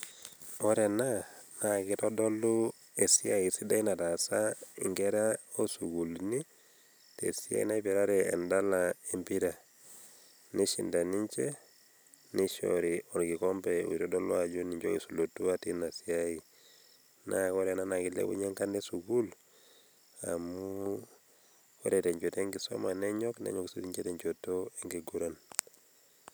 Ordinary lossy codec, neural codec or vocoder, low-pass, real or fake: none; none; none; real